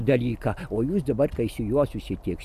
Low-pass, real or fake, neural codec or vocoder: 14.4 kHz; real; none